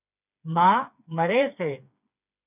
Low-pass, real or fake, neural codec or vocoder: 3.6 kHz; fake; codec, 16 kHz, 4 kbps, FreqCodec, smaller model